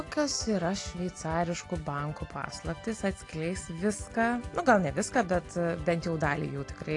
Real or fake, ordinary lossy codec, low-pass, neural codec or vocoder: real; AAC, 48 kbps; 10.8 kHz; none